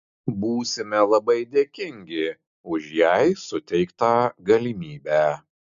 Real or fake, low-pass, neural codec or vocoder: real; 7.2 kHz; none